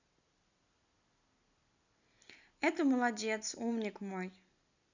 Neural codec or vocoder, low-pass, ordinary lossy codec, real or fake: none; 7.2 kHz; none; real